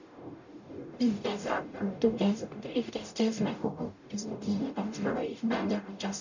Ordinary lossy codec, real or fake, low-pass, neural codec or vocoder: none; fake; 7.2 kHz; codec, 44.1 kHz, 0.9 kbps, DAC